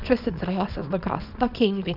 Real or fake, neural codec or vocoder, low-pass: fake; codec, 24 kHz, 0.9 kbps, WavTokenizer, small release; 5.4 kHz